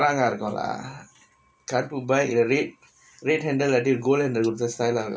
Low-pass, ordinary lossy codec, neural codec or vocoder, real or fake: none; none; none; real